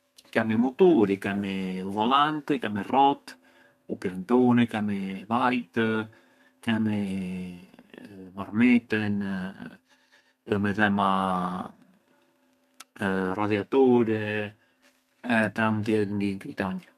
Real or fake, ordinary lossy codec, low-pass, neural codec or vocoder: fake; MP3, 96 kbps; 14.4 kHz; codec, 32 kHz, 1.9 kbps, SNAC